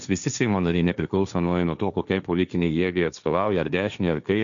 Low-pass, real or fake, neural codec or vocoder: 7.2 kHz; fake; codec, 16 kHz, 1.1 kbps, Voila-Tokenizer